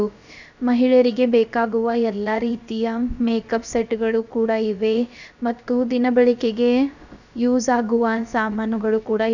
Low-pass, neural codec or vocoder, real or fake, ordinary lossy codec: 7.2 kHz; codec, 16 kHz, about 1 kbps, DyCAST, with the encoder's durations; fake; none